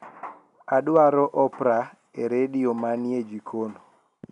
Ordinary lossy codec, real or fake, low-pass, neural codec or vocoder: none; real; 10.8 kHz; none